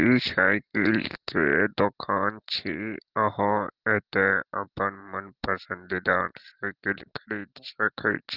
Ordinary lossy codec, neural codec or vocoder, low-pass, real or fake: Opus, 16 kbps; none; 5.4 kHz; real